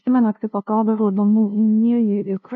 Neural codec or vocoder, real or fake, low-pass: codec, 16 kHz, 0.5 kbps, FunCodec, trained on LibriTTS, 25 frames a second; fake; 7.2 kHz